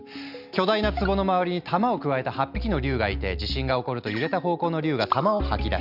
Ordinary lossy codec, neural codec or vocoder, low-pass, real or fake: none; none; 5.4 kHz; real